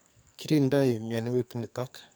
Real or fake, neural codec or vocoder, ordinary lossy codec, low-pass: fake; codec, 44.1 kHz, 2.6 kbps, SNAC; none; none